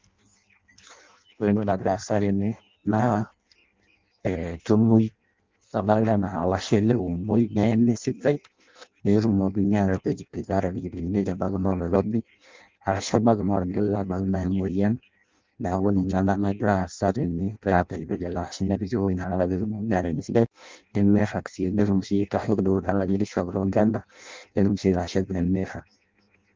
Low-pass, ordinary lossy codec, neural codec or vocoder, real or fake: 7.2 kHz; Opus, 32 kbps; codec, 16 kHz in and 24 kHz out, 0.6 kbps, FireRedTTS-2 codec; fake